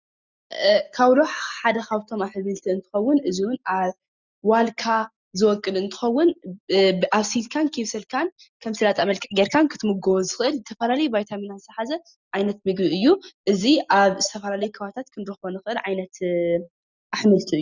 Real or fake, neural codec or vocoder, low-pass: real; none; 7.2 kHz